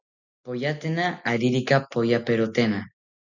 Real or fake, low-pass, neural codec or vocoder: real; 7.2 kHz; none